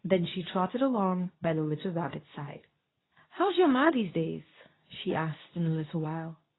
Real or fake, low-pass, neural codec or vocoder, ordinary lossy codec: fake; 7.2 kHz; codec, 24 kHz, 0.9 kbps, WavTokenizer, medium speech release version 2; AAC, 16 kbps